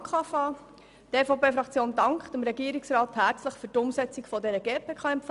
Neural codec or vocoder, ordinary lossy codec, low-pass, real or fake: none; MP3, 96 kbps; 10.8 kHz; real